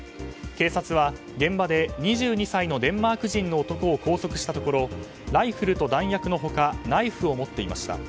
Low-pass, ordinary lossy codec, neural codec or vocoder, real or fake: none; none; none; real